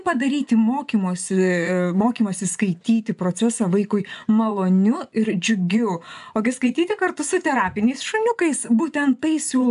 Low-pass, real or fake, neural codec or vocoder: 10.8 kHz; fake; vocoder, 24 kHz, 100 mel bands, Vocos